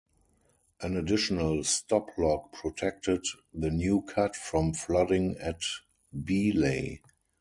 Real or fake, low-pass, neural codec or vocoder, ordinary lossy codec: real; 10.8 kHz; none; MP3, 64 kbps